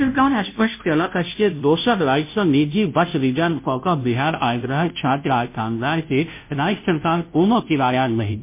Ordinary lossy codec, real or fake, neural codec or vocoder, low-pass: MP3, 24 kbps; fake; codec, 16 kHz, 0.5 kbps, FunCodec, trained on Chinese and English, 25 frames a second; 3.6 kHz